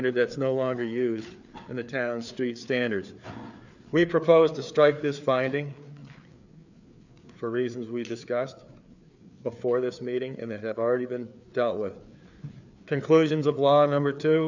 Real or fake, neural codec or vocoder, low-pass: fake; codec, 16 kHz, 4 kbps, FreqCodec, larger model; 7.2 kHz